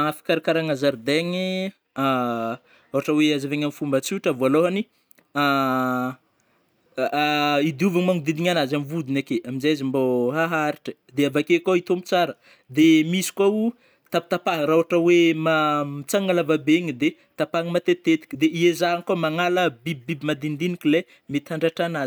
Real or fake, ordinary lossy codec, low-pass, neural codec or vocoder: real; none; none; none